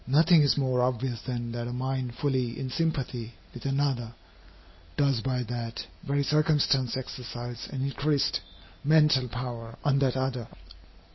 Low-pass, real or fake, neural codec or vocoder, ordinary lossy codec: 7.2 kHz; real; none; MP3, 24 kbps